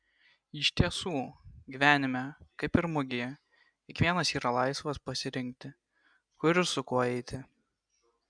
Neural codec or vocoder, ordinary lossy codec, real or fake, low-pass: none; MP3, 96 kbps; real; 9.9 kHz